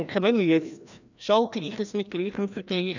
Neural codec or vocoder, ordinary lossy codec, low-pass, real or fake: codec, 16 kHz, 1 kbps, FreqCodec, larger model; none; 7.2 kHz; fake